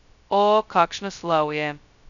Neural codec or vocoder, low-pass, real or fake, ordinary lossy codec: codec, 16 kHz, 0.2 kbps, FocalCodec; 7.2 kHz; fake; none